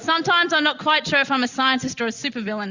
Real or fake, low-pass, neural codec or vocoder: real; 7.2 kHz; none